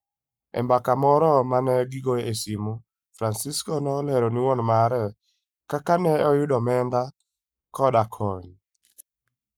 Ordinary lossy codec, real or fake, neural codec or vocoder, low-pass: none; fake; codec, 44.1 kHz, 7.8 kbps, Pupu-Codec; none